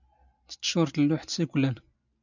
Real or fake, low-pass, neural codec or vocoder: real; 7.2 kHz; none